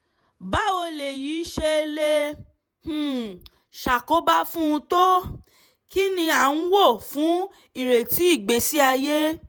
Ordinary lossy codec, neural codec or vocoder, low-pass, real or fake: none; vocoder, 48 kHz, 128 mel bands, Vocos; none; fake